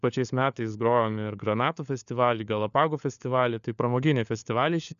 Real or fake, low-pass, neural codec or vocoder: fake; 7.2 kHz; codec, 16 kHz, 4 kbps, FunCodec, trained on LibriTTS, 50 frames a second